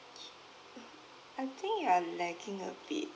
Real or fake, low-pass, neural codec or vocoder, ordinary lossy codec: real; none; none; none